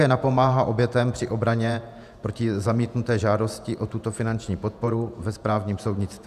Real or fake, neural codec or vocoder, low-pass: fake; vocoder, 48 kHz, 128 mel bands, Vocos; 14.4 kHz